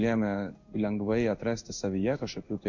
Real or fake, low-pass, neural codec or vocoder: fake; 7.2 kHz; codec, 16 kHz in and 24 kHz out, 1 kbps, XY-Tokenizer